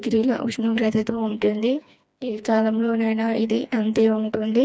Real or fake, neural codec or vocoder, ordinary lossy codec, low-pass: fake; codec, 16 kHz, 2 kbps, FreqCodec, smaller model; none; none